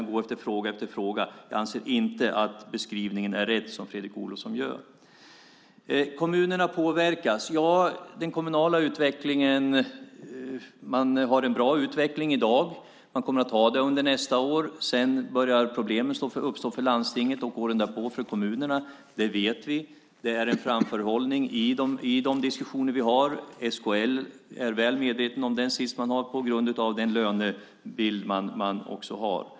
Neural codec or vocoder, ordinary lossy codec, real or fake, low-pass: none; none; real; none